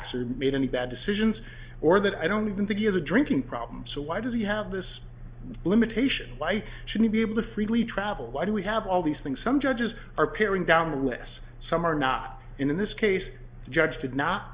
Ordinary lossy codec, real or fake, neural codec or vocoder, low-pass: Opus, 64 kbps; real; none; 3.6 kHz